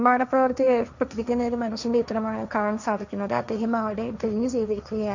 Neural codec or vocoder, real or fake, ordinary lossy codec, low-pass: codec, 16 kHz, 1.1 kbps, Voila-Tokenizer; fake; none; 7.2 kHz